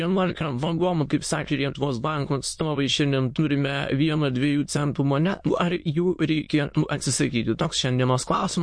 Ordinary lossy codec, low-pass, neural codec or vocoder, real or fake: MP3, 48 kbps; 9.9 kHz; autoencoder, 22.05 kHz, a latent of 192 numbers a frame, VITS, trained on many speakers; fake